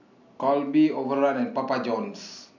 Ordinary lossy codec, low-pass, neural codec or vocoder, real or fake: none; 7.2 kHz; none; real